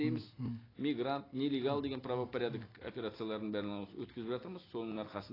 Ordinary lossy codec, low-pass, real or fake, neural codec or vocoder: AAC, 24 kbps; 5.4 kHz; real; none